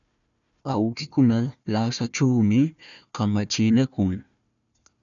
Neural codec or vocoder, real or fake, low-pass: codec, 16 kHz, 1 kbps, FunCodec, trained on Chinese and English, 50 frames a second; fake; 7.2 kHz